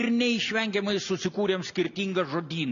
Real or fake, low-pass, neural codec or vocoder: real; 7.2 kHz; none